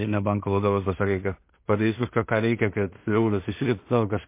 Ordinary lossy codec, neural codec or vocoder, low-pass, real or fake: MP3, 24 kbps; codec, 16 kHz in and 24 kHz out, 0.4 kbps, LongCat-Audio-Codec, two codebook decoder; 3.6 kHz; fake